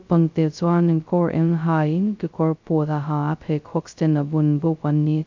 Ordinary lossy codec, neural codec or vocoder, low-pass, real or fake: MP3, 64 kbps; codec, 16 kHz, 0.2 kbps, FocalCodec; 7.2 kHz; fake